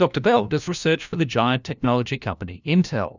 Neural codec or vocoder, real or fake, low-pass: codec, 16 kHz, 1 kbps, FunCodec, trained on LibriTTS, 50 frames a second; fake; 7.2 kHz